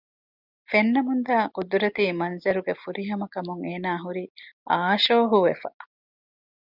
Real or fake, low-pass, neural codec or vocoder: real; 5.4 kHz; none